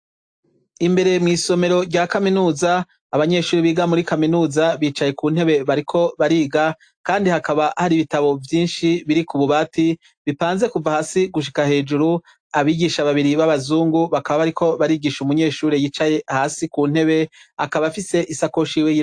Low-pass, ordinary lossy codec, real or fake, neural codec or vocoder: 9.9 kHz; AAC, 64 kbps; real; none